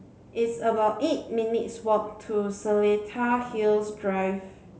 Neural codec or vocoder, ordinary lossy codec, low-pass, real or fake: none; none; none; real